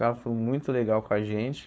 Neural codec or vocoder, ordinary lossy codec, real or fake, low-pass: codec, 16 kHz, 4.8 kbps, FACodec; none; fake; none